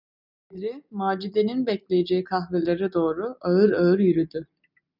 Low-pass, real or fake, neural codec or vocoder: 5.4 kHz; real; none